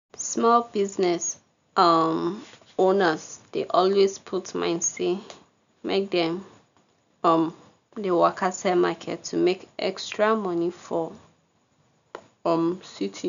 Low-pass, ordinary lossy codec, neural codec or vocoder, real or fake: 7.2 kHz; none; none; real